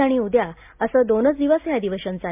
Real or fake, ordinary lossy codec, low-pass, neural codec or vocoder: real; MP3, 32 kbps; 3.6 kHz; none